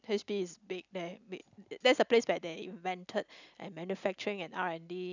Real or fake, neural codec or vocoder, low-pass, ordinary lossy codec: real; none; 7.2 kHz; none